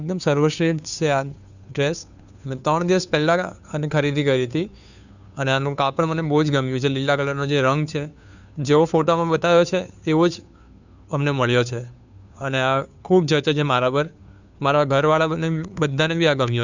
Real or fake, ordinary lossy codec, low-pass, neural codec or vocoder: fake; none; 7.2 kHz; codec, 16 kHz, 2 kbps, FunCodec, trained on Chinese and English, 25 frames a second